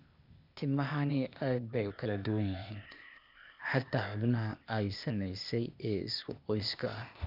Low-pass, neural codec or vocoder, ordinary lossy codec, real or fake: 5.4 kHz; codec, 16 kHz, 0.8 kbps, ZipCodec; none; fake